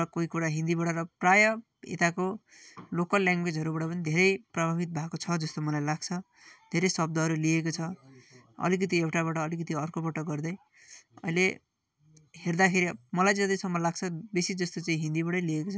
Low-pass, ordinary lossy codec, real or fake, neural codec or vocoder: none; none; real; none